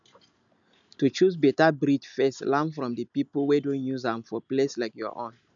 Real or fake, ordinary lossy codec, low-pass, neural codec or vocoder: real; none; 7.2 kHz; none